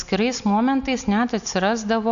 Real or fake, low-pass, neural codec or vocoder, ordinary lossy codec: real; 7.2 kHz; none; MP3, 96 kbps